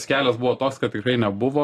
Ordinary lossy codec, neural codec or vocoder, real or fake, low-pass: AAC, 48 kbps; none; real; 14.4 kHz